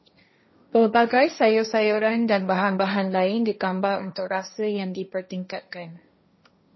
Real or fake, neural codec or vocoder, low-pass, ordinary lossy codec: fake; codec, 16 kHz, 1.1 kbps, Voila-Tokenizer; 7.2 kHz; MP3, 24 kbps